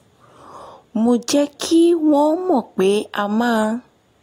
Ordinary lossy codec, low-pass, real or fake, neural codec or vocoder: AAC, 48 kbps; 19.8 kHz; real; none